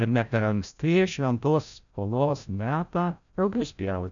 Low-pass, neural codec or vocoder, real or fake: 7.2 kHz; codec, 16 kHz, 0.5 kbps, FreqCodec, larger model; fake